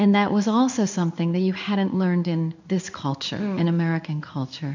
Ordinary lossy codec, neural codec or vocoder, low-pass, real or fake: MP3, 64 kbps; codec, 16 kHz in and 24 kHz out, 1 kbps, XY-Tokenizer; 7.2 kHz; fake